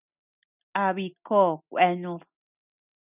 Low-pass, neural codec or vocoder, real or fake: 3.6 kHz; none; real